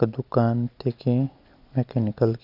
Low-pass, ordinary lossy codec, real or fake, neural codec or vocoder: 5.4 kHz; none; real; none